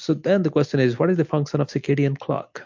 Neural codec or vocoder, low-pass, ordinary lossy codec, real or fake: none; 7.2 kHz; MP3, 48 kbps; real